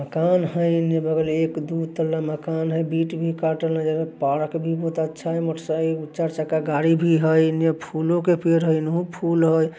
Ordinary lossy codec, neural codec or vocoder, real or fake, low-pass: none; none; real; none